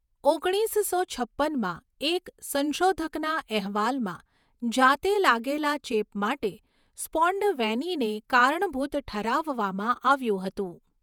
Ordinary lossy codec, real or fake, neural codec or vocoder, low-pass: none; fake; vocoder, 48 kHz, 128 mel bands, Vocos; 19.8 kHz